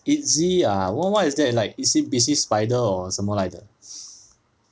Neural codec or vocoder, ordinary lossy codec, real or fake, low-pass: none; none; real; none